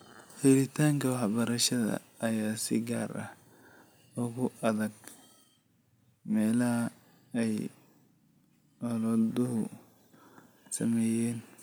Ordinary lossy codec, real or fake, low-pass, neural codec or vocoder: none; real; none; none